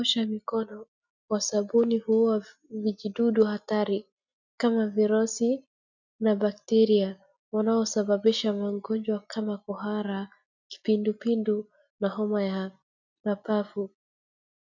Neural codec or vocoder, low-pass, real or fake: none; 7.2 kHz; real